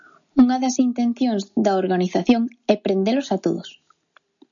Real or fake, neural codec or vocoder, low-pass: real; none; 7.2 kHz